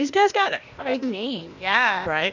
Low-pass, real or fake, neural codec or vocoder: 7.2 kHz; fake; codec, 16 kHz, 0.8 kbps, ZipCodec